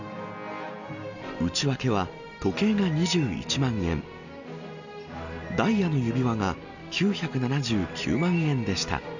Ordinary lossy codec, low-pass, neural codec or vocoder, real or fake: none; 7.2 kHz; none; real